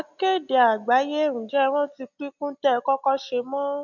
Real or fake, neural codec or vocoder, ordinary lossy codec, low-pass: real; none; MP3, 64 kbps; 7.2 kHz